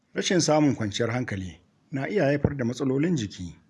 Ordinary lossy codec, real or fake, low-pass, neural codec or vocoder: none; real; none; none